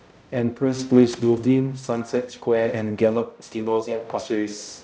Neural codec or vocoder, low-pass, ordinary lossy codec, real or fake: codec, 16 kHz, 0.5 kbps, X-Codec, HuBERT features, trained on balanced general audio; none; none; fake